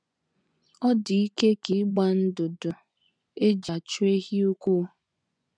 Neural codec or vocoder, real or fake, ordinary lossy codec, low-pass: vocoder, 24 kHz, 100 mel bands, Vocos; fake; none; 9.9 kHz